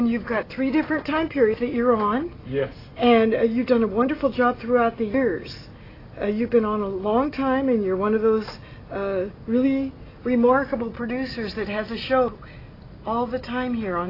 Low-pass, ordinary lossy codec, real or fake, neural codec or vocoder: 5.4 kHz; AAC, 24 kbps; real; none